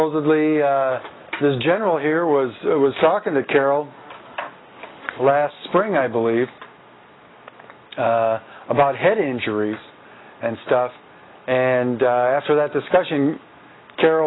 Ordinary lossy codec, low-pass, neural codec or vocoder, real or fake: AAC, 16 kbps; 7.2 kHz; none; real